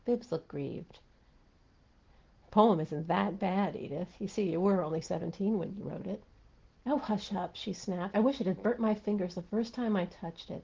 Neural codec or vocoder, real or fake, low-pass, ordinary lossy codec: none; real; 7.2 kHz; Opus, 16 kbps